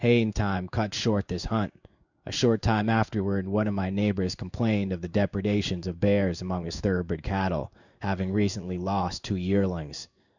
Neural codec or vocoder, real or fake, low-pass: codec, 16 kHz in and 24 kHz out, 1 kbps, XY-Tokenizer; fake; 7.2 kHz